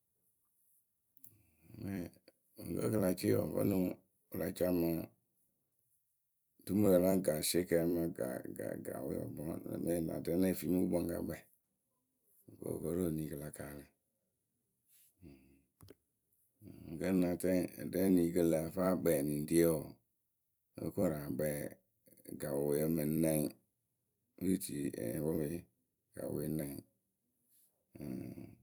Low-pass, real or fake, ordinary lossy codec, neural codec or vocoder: none; fake; none; vocoder, 44.1 kHz, 128 mel bands every 256 samples, BigVGAN v2